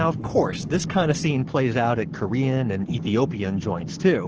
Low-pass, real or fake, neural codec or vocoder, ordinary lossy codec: 7.2 kHz; fake; codec, 44.1 kHz, 7.8 kbps, Pupu-Codec; Opus, 16 kbps